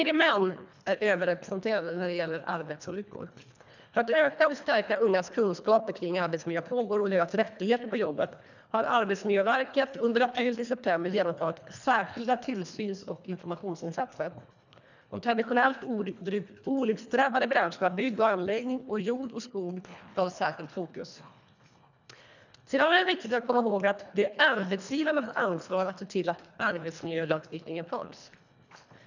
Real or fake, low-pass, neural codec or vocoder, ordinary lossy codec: fake; 7.2 kHz; codec, 24 kHz, 1.5 kbps, HILCodec; none